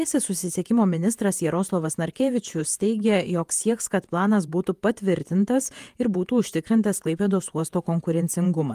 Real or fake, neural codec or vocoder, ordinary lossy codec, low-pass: fake; vocoder, 48 kHz, 128 mel bands, Vocos; Opus, 32 kbps; 14.4 kHz